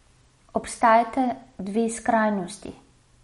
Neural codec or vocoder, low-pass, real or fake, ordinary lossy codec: none; 19.8 kHz; real; MP3, 48 kbps